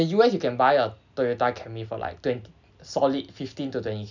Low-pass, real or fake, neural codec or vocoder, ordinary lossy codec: 7.2 kHz; real; none; none